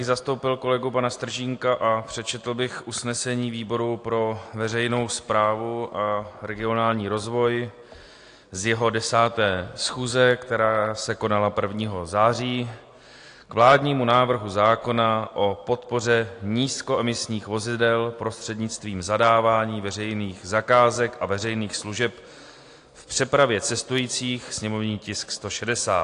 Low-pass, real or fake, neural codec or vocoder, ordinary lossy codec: 9.9 kHz; real; none; AAC, 48 kbps